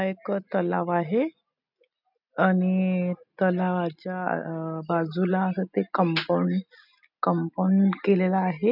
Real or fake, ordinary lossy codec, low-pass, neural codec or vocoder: real; none; 5.4 kHz; none